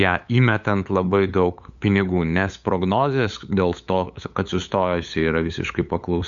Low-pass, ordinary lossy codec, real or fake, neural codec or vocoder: 7.2 kHz; MP3, 96 kbps; fake; codec, 16 kHz, 8 kbps, FunCodec, trained on LibriTTS, 25 frames a second